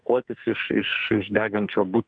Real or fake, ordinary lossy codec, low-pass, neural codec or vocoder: fake; AAC, 64 kbps; 9.9 kHz; codec, 44.1 kHz, 2.6 kbps, SNAC